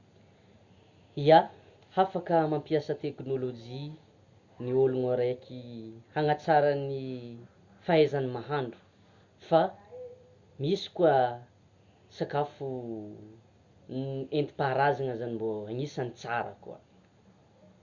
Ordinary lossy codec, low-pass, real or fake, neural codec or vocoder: Opus, 64 kbps; 7.2 kHz; real; none